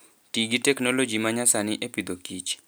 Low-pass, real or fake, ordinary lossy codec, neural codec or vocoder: none; real; none; none